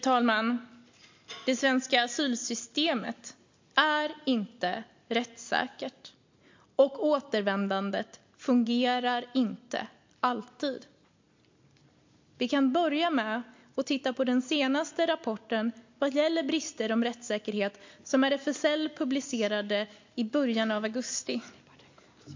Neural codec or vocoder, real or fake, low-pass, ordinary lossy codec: none; real; 7.2 kHz; MP3, 48 kbps